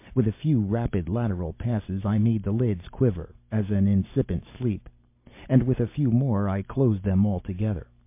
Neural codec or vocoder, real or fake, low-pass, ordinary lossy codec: none; real; 3.6 kHz; MP3, 24 kbps